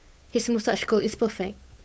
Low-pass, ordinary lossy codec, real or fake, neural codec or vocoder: none; none; fake; codec, 16 kHz, 8 kbps, FunCodec, trained on Chinese and English, 25 frames a second